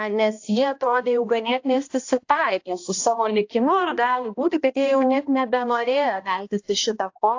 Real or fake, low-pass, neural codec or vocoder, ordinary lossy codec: fake; 7.2 kHz; codec, 16 kHz, 1 kbps, X-Codec, HuBERT features, trained on balanced general audio; AAC, 48 kbps